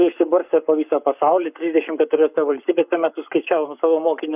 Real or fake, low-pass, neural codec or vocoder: fake; 3.6 kHz; codec, 44.1 kHz, 7.8 kbps, Pupu-Codec